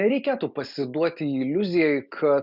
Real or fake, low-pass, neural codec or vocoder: real; 5.4 kHz; none